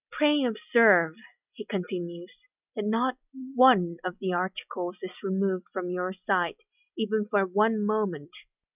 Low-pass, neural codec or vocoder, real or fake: 3.6 kHz; none; real